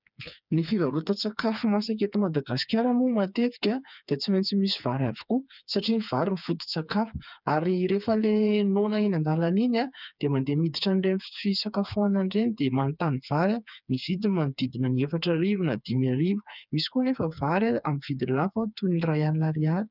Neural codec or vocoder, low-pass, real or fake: codec, 16 kHz, 4 kbps, FreqCodec, smaller model; 5.4 kHz; fake